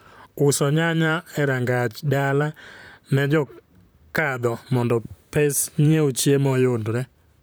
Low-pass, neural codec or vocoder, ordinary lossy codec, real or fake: none; vocoder, 44.1 kHz, 128 mel bands, Pupu-Vocoder; none; fake